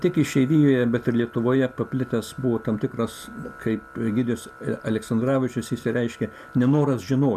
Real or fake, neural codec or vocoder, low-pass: real; none; 14.4 kHz